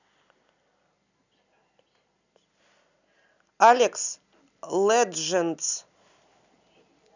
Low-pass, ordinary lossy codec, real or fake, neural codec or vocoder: 7.2 kHz; none; real; none